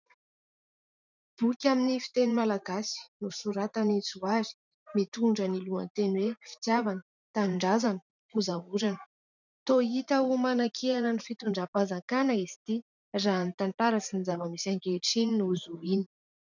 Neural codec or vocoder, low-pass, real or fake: vocoder, 44.1 kHz, 128 mel bands every 512 samples, BigVGAN v2; 7.2 kHz; fake